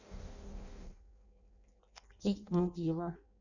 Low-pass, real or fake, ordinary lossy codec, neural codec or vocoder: 7.2 kHz; fake; none; codec, 16 kHz in and 24 kHz out, 0.6 kbps, FireRedTTS-2 codec